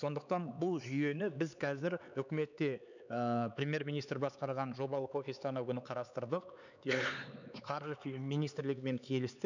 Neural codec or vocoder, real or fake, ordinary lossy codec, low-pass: codec, 16 kHz, 4 kbps, X-Codec, HuBERT features, trained on LibriSpeech; fake; none; 7.2 kHz